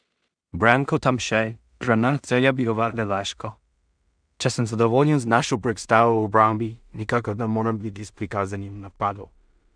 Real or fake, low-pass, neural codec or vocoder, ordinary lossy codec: fake; 9.9 kHz; codec, 16 kHz in and 24 kHz out, 0.4 kbps, LongCat-Audio-Codec, two codebook decoder; none